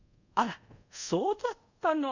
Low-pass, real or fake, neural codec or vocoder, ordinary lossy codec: 7.2 kHz; fake; codec, 24 kHz, 0.5 kbps, DualCodec; none